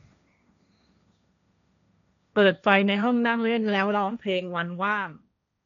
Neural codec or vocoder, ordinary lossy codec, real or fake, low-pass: codec, 16 kHz, 1.1 kbps, Voila-Tokenizer; none; fake; 7.2 kHz